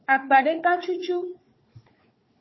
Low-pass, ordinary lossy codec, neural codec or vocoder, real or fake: 7.2 kHz; MP3, 24 kbps; codec, 16 kHz, 4 kbps, FunCodec, trained on Chinese and English, 50 frames a second; fake